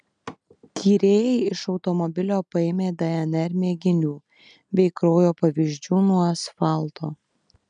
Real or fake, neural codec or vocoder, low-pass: real; none; 9.9 kHz